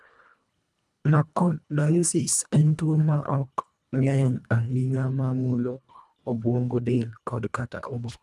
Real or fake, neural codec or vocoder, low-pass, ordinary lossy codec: fake; codec, 24 kHz, 1.5 kbps, HILCodec; none; none